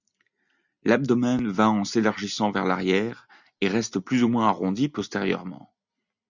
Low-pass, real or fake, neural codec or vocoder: 7.2 kHz; real; none